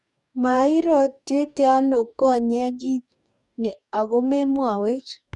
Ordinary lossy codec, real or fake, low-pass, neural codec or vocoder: none; fake; 10.8 kHz; codec, 44.1 kHz, 2.6 kbps, DAC